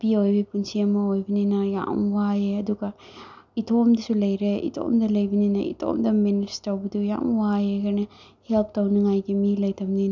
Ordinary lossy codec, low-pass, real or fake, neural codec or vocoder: none; 7.2 kHz; real; none